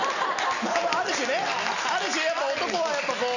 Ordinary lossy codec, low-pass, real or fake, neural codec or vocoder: none; 7.2 kHz; real; none